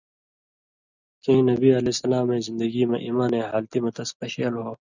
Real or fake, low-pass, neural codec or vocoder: real; 7.2 kHz; none